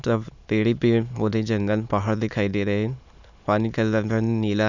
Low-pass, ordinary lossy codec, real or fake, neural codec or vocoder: 7.2 kHz; none; fake; autoencoder, 22.05 kHz, a latent of 192 numbers a frame, VITS, trained on many speakers